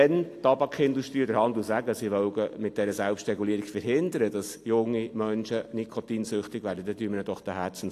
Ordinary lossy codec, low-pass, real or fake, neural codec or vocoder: AAC, 64 kbps; 14.4 kHz; real; none